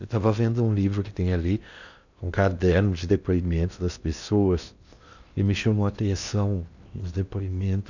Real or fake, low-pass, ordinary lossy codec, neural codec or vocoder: fake; 7.2 kHz; none; codec, 16 kHz in and 24 kHz out, 0.8 kbps, FocalCodec, streaming, 65536 codes